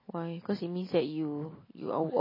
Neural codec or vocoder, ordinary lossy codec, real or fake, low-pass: none; MP3, 24 kbps; real; 5.4 kHz